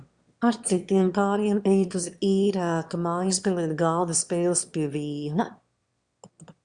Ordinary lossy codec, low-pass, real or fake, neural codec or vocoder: Opus, 64 kbps; 9.9 kHz; fake; autoencoder, 22.05 kHz, a latent of 192 numbers a frame, VITS, trained on one speaker